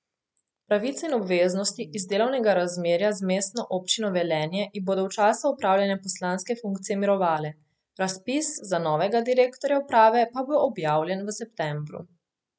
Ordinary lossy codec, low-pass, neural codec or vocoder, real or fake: none; none; none; real